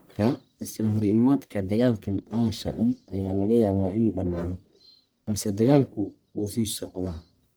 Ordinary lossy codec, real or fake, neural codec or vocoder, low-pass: none; fake; codec, 44.1 kHz, 1.7 kbps, Pupu-Codec; none